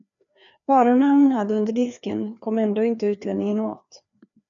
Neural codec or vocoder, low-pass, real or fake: codec, 16 kHz, 2 kbps, FreqCodec, larger model; 7.2 kHz; fake